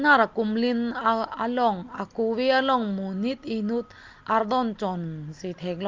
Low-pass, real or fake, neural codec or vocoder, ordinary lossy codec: 7.2 kHz; real; none; Opus, 32 kbps